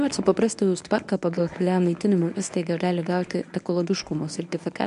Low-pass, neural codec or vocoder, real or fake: 10.8 kHz; codec, 24 kHz, 0.9 kbps, WavTokenizer, medium speech release version 1; fake